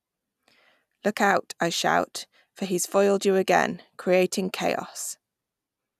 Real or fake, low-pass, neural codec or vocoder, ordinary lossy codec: real; 14.4 kHz; none; none